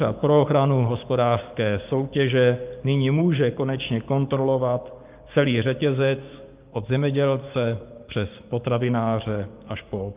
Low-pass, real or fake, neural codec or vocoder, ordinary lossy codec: 3.6 kHz; fake; codec, 16 kHz, 6 kbps, DAC; Opus, 24 kbps